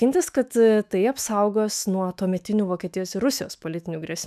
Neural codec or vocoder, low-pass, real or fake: autoencoder, 48 kHz, 128 numbers a frame, DAC-VAE, trained on Japanese speech; 14.4 kHz; fake